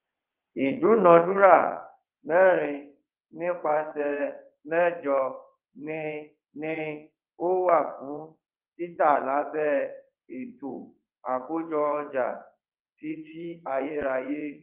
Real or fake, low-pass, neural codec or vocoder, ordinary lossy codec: fake; 3.6 kHz; vocoder, 22.05 kHz, 80 mel bands, WaveNeXt; Opus, 24 kbps